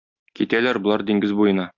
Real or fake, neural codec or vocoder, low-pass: real; none; 7.2 kHz